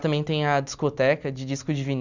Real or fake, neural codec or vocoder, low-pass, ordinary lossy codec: real; none; 7.2 kHz; none